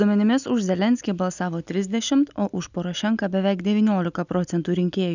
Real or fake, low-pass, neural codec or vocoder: real; 7.2 kHz; none